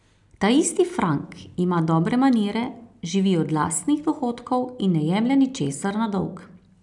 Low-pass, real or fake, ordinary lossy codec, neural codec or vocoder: 10.8 kHz; real; none; none